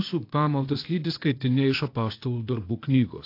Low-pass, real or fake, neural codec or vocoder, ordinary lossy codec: 5.4 kHz; fake; codec, 16 kHz, 0.8 kbps, ZipCodec; AAC, 32 kbps